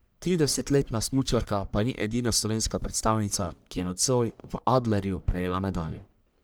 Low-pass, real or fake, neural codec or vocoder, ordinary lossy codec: none; fake; codec, 44.1 kHz, 1.7 kbps, Pupu-Codec; none